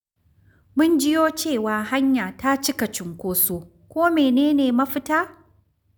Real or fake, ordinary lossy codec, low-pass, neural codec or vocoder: real; none; none; none